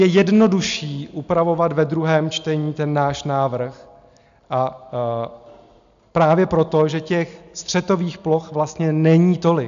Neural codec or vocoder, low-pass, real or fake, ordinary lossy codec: none; 7.2 kHz; real; MP3, 64 kbps